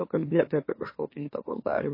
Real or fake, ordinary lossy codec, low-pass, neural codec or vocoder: fake; MP3, 24 kbps; 5.4 kHz; autoencoder, 44.1 kHz, a latent of 192 numbers a frame, MeloTTS